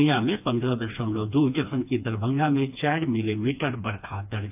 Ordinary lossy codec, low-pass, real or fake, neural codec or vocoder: AAC, 32 kbps; 3.6 kHz; fake; codec, 16 kHz, 2 kbps, FreqCodec, smaller model